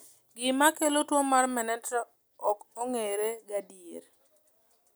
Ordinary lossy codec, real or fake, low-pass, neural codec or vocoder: none; real; none; none